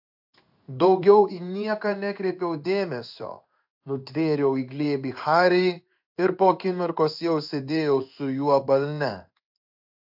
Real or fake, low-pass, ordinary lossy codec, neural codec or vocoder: fake; 5.4 kHz; AAC, 48 kbps; codec, 16 kHz in and 24 kHz out, 1 kbps, XY-Tokenizer